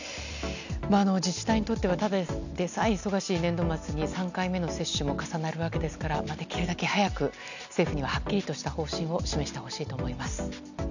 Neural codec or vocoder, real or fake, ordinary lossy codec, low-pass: none; real; none; 7.2 kHz